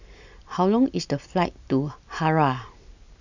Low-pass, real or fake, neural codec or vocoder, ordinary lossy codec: 7.2 kHz; real; none; none